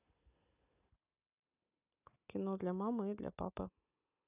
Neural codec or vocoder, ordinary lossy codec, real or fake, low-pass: none; none; real; 3.6 kHz